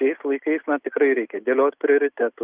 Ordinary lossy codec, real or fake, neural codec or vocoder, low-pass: Opus, 32 kbps; real; none; 3.6 kHz